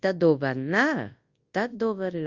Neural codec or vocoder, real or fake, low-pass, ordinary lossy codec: codec, 24 kHz, 0.9 kbps, WavTokenizer, large speech release; fake; 7.2 kHz; Opus, 32 kbps